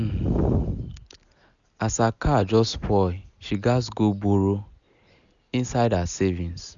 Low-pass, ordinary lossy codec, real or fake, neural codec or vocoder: 7.2 kHz; none; real; none